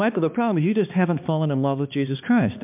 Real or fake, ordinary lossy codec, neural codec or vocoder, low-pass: fake; MP3, 32 kbps; codec, 16 kHz, 2 kbps, X-Codec, HuBERT features, trained on balanced general audio; 3.6 kHz